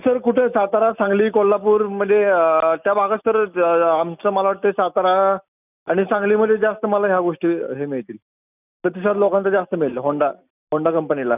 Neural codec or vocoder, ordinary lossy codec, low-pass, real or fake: none; none; 3.6 kHz; real